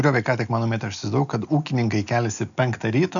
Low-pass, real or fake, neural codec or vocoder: 7.2 kHz; real; none